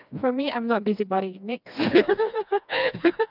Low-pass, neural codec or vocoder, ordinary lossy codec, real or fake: 5.4 kHz; codec, 16 kHz, 2 kbps, FreqCodec, smaller model; none; fake